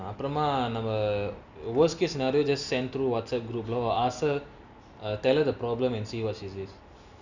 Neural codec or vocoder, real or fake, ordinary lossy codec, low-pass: none; real; none; 7.2 kHz